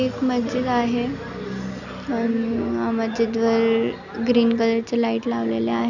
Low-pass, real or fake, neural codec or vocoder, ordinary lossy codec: 7.2 kHz; real; none; none